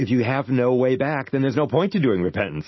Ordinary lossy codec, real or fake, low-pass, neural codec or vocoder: MP3, 24 kbps; real; 7.2 kHz; none